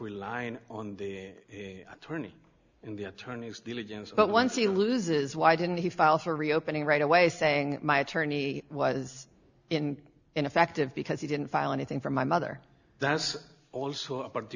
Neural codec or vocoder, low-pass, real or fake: none; 7.2 kHz; real